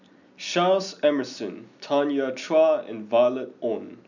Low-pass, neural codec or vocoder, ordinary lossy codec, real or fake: 7.2 kHz; none; none; real